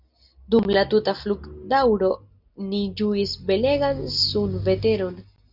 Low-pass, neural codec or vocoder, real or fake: 5.4 kHz; none; real